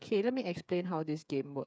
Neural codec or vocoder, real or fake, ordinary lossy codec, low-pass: codec, 16 kHz, 8 kbps, FreqCodec, smaller model; fake; none; none